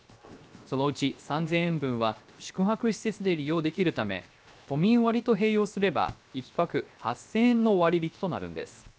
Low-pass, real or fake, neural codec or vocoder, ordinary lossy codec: none; fake; codec, 16 kHz, 0.7 kbps, FocalCodec; none